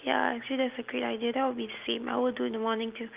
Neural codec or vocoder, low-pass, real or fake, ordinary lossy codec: none; 3.6 kHz; real; Opus, 32 kbps